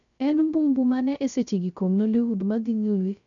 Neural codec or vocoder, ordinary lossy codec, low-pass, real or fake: codec, 16 kHz, 0.3 kbps, FocalCodec; none; 7.2 kHz; fake